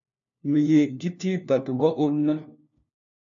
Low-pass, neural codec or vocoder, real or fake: 7.2 kHz; codec, 16 kHz, 1 kbps, FunCodec, trained on LibriTTS, 50 frames a second; fake